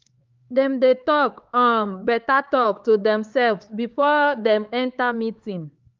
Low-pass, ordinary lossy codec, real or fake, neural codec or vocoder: 7.2 kHz; Opus, 32 kbps; fake; codec, 16 kHz, 4 kbps, X-Codec, HuBERT features, trained on LibriSpeech